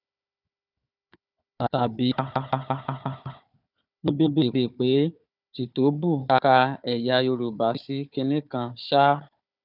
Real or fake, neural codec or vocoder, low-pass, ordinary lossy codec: fake; codec, 16 kHz, 4 kbps, FunCodec, trained on Chinese and English, 50 frames a second; 5.4 kHz; none